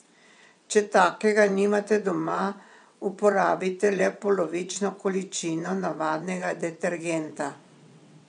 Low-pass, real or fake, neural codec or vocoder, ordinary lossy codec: 9.9 kHz; fake; vocoder, 22.05 kHz, 80 mel bands, WaveNeXt; none